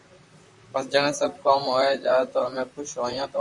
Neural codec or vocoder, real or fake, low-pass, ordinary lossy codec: vocoder, 44.1 kHz, 128 mel bands, Pupu-Vocoder; fake; 10.8 kHz; MP3, 96 kbps